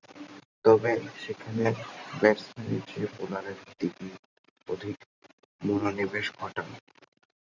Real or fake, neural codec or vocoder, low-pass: fake; vocoder, 44.1 kHz, 128 mel bands every 512 samples, BigVGAN v2; 7.2 kHz